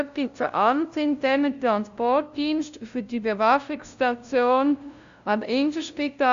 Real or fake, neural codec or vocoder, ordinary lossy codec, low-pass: fake; codec, 16 kHz, 0.5 kbps, FunCodec, trained on LibriTTS, 25 frames a second; Opus, 64 kbps; 7.2 kHz